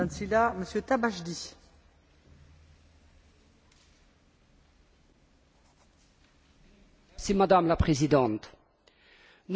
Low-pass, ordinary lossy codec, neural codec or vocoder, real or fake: none; none; none; real